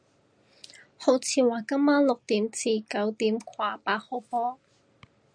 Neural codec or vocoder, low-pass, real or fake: none; 9.9 kHz; real